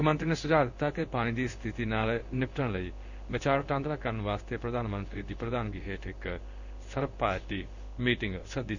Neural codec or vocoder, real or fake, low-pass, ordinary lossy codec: codec, 16 kHz in and 24 kHz out, 1 kbps, XY-Tokenizer; fake; 7.2 kHz; none